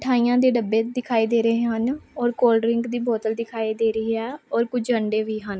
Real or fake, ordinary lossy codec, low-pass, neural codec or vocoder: real; none; none; none